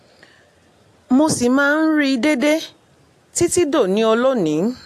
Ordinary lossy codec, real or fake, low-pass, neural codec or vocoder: AAC, 64 kbps; real; 14.4 kHz; none